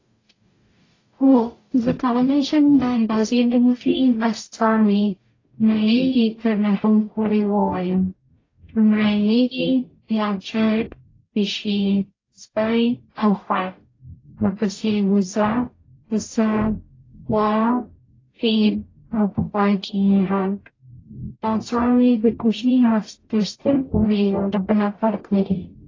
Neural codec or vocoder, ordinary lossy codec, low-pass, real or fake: codec, 44.1 kHz, 0.9 kbps, DAC; AAC, 32 kbps; 7.2 kHz; fake